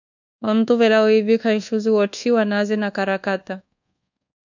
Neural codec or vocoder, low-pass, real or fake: codec, 24 kHz, 1.2 kbps, DualCodec; 7.2 kHz; fake